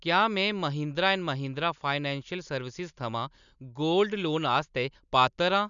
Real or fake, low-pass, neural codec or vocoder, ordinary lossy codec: real; 7.2 kHz; none; none